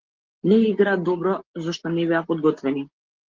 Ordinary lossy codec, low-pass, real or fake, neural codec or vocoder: Opus, 16 kbps; 7.2 kHz; real; none